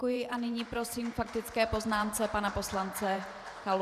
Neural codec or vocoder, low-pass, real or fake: vocoder, 44.1 kHz, 128 mel bands every 512 samples, BigVGAN v2; 14.4 kHz; fake